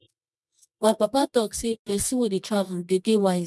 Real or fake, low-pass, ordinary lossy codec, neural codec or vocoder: fake; none; none; codec, 24 kHz, 0.9 kbps, WavTokenizer, medium music audio release